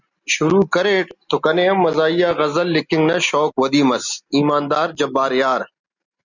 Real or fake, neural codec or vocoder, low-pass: real; none; 7.2 kHz